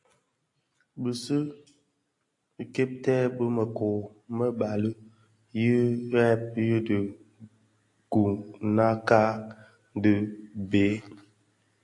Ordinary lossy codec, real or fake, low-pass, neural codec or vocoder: AAC, 48 kbps; real; 10.8 kHz; none